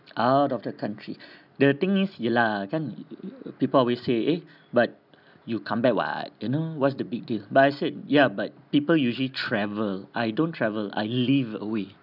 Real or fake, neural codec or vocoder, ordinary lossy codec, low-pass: real; none; none; 5.4 kHz